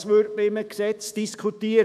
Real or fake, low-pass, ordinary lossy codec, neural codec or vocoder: fake; 14.4 kHz; none; autoencoder, 48 kHz, 128 numbers a frame, DAC-VAE, trained on Japanese speech